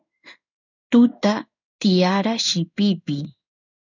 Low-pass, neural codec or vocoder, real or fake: 7.2 kHz; codec, 16 kHz in and 24 kHz out, 1 kbps, XY-Tokenizer; fake